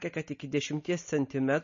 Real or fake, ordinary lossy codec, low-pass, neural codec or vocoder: real; MP3, 32 kbps; 7.2 kHz; none